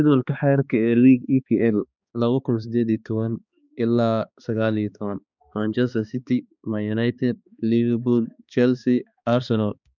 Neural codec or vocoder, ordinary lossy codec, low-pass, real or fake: codec, 16 kHz, 2 kbps, X-Codec, HuBERT features, trained on balanced general audio; none; 7.2 kHz; fake